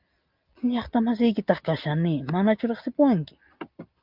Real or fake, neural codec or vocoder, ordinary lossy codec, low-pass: real; none; Opus, 24 kbps; 5.4 kHz